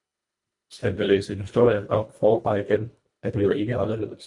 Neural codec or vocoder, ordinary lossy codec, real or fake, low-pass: codec, 24 kHz, 1.5 kbps, HILCodec; MP3, 64 kbps; fake; 10.8 kHz